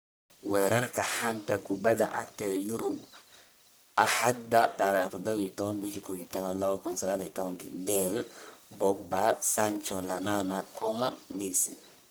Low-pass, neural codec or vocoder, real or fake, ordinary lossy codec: none; codec, 44.1 kHz, 1.7 kbps, Pupu-Codec; fake; none